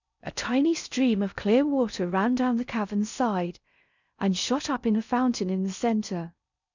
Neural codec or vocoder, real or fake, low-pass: codec, 16 kHz in and 24 kHz out, 0.6 kbps, FocalCodec, streaming, 4096 codes; fake; 7.2 kHz